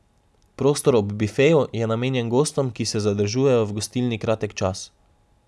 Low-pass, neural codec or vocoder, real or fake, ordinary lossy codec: none; none; real; none